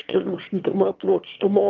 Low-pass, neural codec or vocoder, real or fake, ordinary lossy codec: 7.2 kHz; autoencoder, 22.05 kHz, a latent of 192 numbers a frame, VITS, trained on one speaker; fake; Opus, 32 kbps